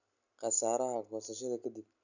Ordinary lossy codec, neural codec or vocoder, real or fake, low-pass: none; none; real; 7.2 kHz